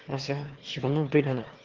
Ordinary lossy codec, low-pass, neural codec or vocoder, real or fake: Opus, 16 kbps; 7.2 kHz; autoencoder, 22.05 kHz, a latent of 192 numbers a frame, VITS, trained on one speaker; fake